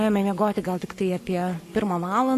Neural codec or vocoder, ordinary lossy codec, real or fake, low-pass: codec, 44.1 kHz, 7.8 kbps, Pupu-Codec; AAC, 64 kbps; fake; 14.4 kHz